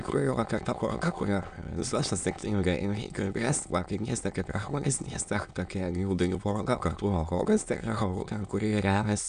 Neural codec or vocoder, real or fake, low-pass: autoencoder, 22.05 kHz, a latent of 192 numbers a frame, VITS, trained on many speakers; fake; 9.9 kHz